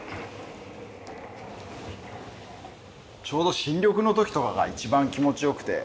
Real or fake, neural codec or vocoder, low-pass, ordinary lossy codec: real; none; none; none